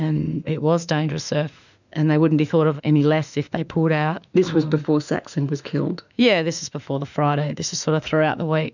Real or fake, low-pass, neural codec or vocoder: fake; 7.2 kHz; autoencoder, 48 kHz, 32 numbers a frame, DAC-VAE, trained on Japanese speech